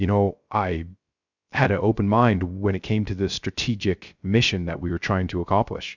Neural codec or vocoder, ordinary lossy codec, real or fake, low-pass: codec, 16 kHz, 0.3 kbps, FocalCodec; Opus, 64 kbps; fake; 7.2 kHz